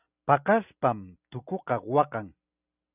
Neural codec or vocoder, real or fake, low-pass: none; real; 3.6 kHz